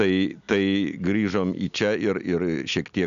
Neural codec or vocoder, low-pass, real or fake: none; 7.2 kHz; real